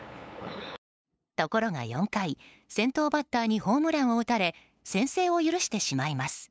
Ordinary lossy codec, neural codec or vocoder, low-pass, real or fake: none; codec, 16 kHz, 8 kbps, FunCodec, trained on LibriTTS, 25 frames a second; none; fake